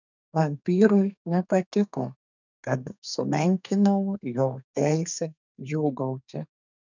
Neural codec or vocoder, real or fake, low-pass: codec, 32 kHz, 1.9 kbps, SNAC; fake; 7.2 kHz